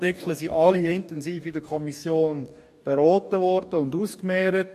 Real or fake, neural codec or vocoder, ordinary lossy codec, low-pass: fake; codec, 44.1 kHz, 2.6 kbps, DAC; MP3, 64 kbps; 14.4 kHz